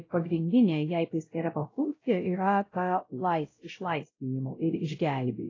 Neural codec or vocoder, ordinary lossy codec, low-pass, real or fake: codec, 16 kHz, 0.5 kbps, X-Codec, WavLM features, trained on Multilingual LibriSpeech; AAC, 32 kbps; 7.2 kHz; fake